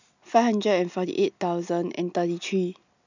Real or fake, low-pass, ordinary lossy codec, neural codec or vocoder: real; 7.2 kHz; none; none